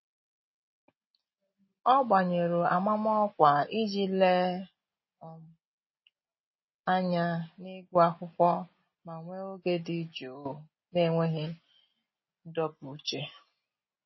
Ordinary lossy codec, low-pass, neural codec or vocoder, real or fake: MP3, 24 kbps; 7.2 kHz; none; real